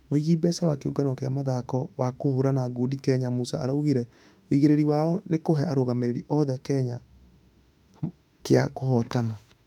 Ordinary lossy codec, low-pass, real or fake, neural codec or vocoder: none; 19.8 kHz; fake; autoencoder, 48 kHz, 32 numbers a frame, DAC-VAE, trained on Japanese speech